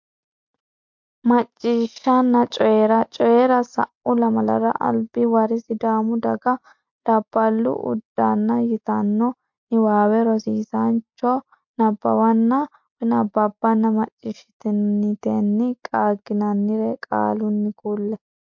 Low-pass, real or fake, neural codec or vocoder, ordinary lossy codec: 7.2 kHz; real; none; MP3, 48 kbps